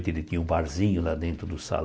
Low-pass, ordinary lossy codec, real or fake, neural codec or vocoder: none; none; real; none